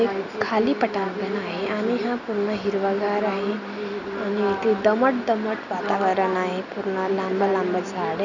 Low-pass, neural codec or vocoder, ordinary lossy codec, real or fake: 7.2 kHz; none; none; real